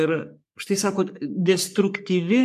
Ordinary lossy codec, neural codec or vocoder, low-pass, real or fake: MP3, 96 kbps; codec, 44.1 kHz, 3.4 kbps, Pupu-Codec; 14.4 kHz; fake